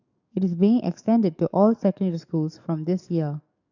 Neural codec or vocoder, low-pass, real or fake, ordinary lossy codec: codec, 44.1 kHz, 7.8 kbps, DAC; 7.2 kHz; fake; none